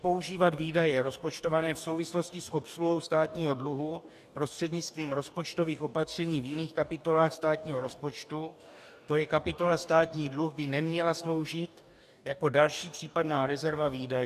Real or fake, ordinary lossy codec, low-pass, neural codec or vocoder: fake; AAC, 96 kbps; 14.4 kHz; codec, 44.1 kHz, 2.6 kbps, DAC